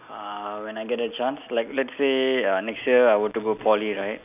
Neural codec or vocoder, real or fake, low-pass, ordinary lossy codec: none; real; 3.6 kHz; none